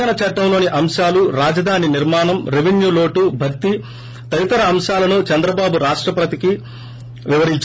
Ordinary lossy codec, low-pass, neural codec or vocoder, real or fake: none; none; none; real